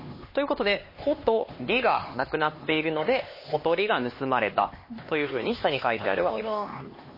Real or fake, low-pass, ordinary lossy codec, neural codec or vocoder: fake; 5.4 kHz; MP3, 24 kbps; codec, 16 kHz, 2 kbps, X-Codec, HuBERT features, trained on LibriSpeech